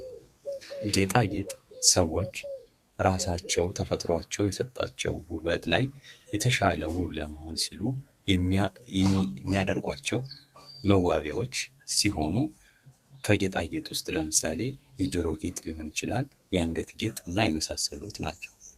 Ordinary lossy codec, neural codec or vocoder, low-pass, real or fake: Opus, 64 kbps; codec, 32 kHz, 1.9 kbps, SNAC; 14.4 kHz; fake